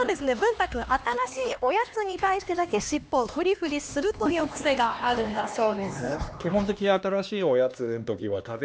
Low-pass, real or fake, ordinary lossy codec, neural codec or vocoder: none; fake; none; codec, 16 kHz, 2 kbps, X-Codec, HuBERT features, trained on LibriSpeech